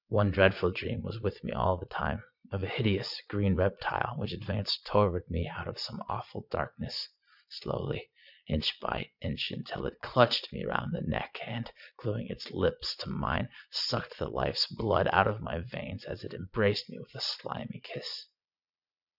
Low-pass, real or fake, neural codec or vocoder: 5.4 kHz; fake; vocoder, 22.05 kHz, 80 mel bands, Vocos